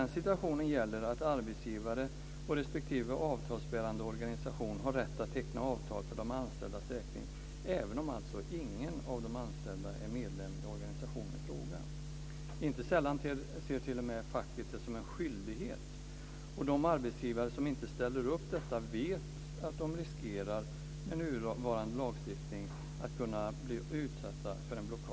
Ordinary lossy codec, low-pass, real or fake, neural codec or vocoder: none; none; real; none